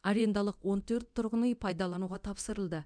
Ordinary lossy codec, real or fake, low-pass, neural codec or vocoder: none; fake; 9.9 kHz; codec, 24 kHz, 0.9 kbps, DualCodec